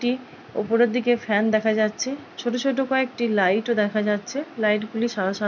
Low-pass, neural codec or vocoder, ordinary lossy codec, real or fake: 7.2 kHz; none; none; real